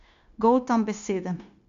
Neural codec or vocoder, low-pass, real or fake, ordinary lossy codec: codec, 16 kHz, 0.9 kbps, LongCat-Audio-Codec; 7.2 kHz; fake; none